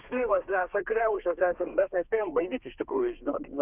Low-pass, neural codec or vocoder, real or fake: 3.6 kHz; codec, 32 kHz, 1.9 kbps, SNAC; fake